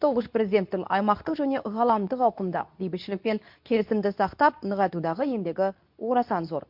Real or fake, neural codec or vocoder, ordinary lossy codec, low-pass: fake; codec, 24 kHz, 0.9 kbps, WavTokenizer, medium speech release version 2; MP3, 48 kbps; 5.4 kHz